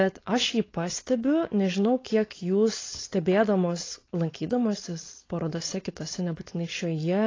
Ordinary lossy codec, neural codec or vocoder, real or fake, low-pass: AAC, 32 kbps; codec, 16 kHz, 4.8 kbps, FACodec; fake; 7.2 kHz